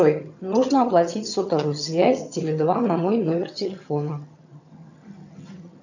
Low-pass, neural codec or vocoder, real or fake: 7.2 kHz; vocoder, 22.05 kHz, 80 mel bands, HiFi-GAN; fake